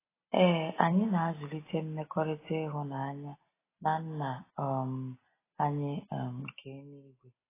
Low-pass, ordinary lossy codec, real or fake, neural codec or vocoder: 3.6 kHz; AAC, 16 kbps; real; none